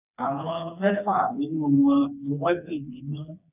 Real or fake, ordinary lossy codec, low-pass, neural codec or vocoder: fake; none; 3.6 kHz; codec, 16 kHz, 2 kbps, FreqCodec, smaller model